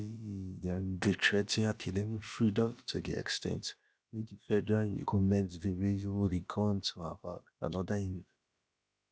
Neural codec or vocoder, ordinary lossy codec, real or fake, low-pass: codec, 16 kHz, about 1 kbps, DyCAST, with the encoder's durations; none; fake; none